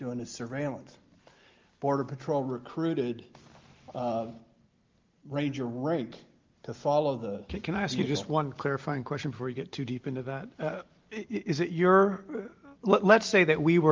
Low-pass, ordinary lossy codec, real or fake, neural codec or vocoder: 7.2 kHz; Opus, 32 kbps; real; none